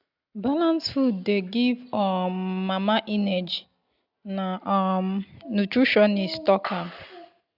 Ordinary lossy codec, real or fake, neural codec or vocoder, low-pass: none; real; none; 5.4 kHz